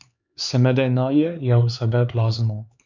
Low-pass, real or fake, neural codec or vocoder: 7.2 kHz; fake; codec, 16 kHz, 4 kbps, X-Codec, HuBERT features, trained on LibriSpeech